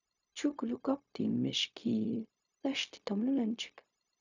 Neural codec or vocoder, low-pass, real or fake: codec, 16 kHz, 0.4 kbps, LongCat-Audio-Codec; 7.2 kHz; fake